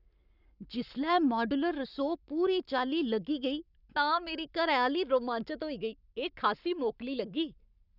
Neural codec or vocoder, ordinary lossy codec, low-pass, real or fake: codec, 44.1 kHz, 7.8 kbps, Pupu-Codec; none; 5.4 kHz; fake